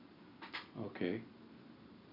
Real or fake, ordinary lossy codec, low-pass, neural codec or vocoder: real; none; 5.4 kHz; none